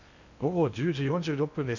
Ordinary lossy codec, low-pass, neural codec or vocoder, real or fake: none; 7.2 kHz; codec, 16 kHz in and 24 kHz out, 0.8 kbps, FocalCodec, streaming, 65536 codes; fake